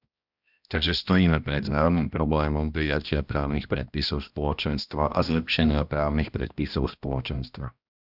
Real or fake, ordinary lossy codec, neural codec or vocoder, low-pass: fake; Opus, 64 kbps; codec, 16 kHz, 1 kbps, X-Codec, HuBERT features, trained on balanced general audio; 5.4 kHz